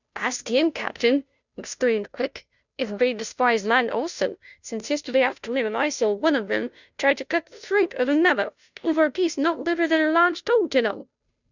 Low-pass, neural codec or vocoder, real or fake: 7.2 kHz; codec, 16 kHz, 0.5 kbps, FunCodec, trained on Chinese and English, 25 frames a second; fake